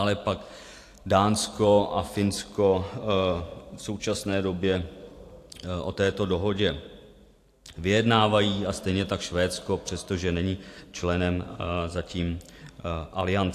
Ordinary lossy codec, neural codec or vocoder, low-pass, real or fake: AAC, 64 kbps; vocoder, 44.1 kHz, 128 mel bands every 512 samples, BigVGAN v2; 14.4 kHz; fake